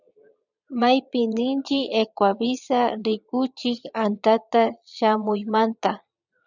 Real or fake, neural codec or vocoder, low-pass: fake; vocoder, 22.05 kHz, 80 mel bands, Vocos; 7.2 kHz